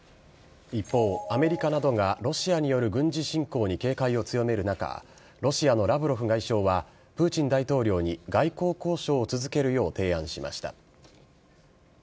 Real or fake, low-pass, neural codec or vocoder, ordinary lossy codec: real; none; none; none